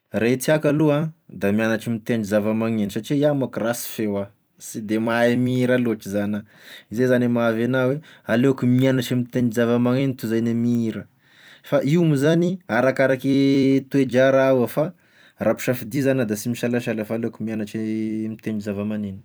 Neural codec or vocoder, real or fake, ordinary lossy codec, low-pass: vocoder, 44.1 kHz, 128 mel bands every 256 samples, BigVGAN v2; fake; none; none